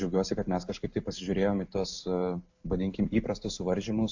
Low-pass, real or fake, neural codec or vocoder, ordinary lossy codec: 7.2 kHz; real; none; AAC, 48 kbps